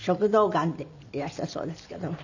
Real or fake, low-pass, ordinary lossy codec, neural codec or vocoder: real; 7.2 kHz; none; none